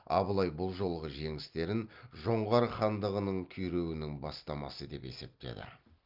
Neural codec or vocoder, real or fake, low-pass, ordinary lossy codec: none; real; 5.4 kHz; Opus, 32 kbps